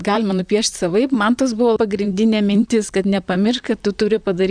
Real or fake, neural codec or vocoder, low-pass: fake; vocoder, 44.1 kHz, 128 mel bands, Pupu-Vocoder; 9.9 kHz